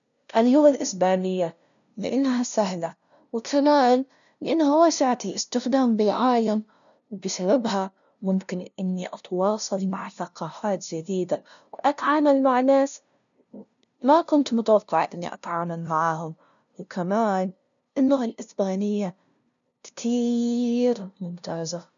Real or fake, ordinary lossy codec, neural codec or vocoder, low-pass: fake; none; codec, 16 kHz, 0.5 kbps, FunCodec, trained on LibriTTS, 25 frames a second; 7.2 kHz